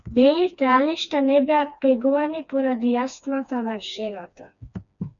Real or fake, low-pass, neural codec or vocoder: fake; 7.2 kHz; codec, 16 kHz, 2 kbps, FreqCodec, smaller model